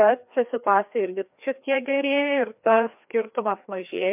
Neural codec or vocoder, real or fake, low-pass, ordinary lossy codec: codec, 16 kHz, 2 kbps, FreqCodec, larger model; fake; 3.6 kHz; MP3, 32 kbps